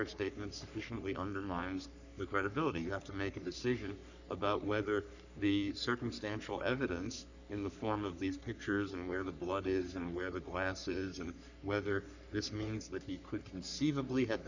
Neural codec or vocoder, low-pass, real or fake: codec, 44.1 kHz, 3.4 kbps, Pupu-Codec; 7.2 kHz; fake